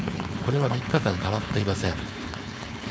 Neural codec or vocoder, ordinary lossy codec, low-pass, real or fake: codec, 16 kHz, 4.8 kbps, FACodec; none; none; fake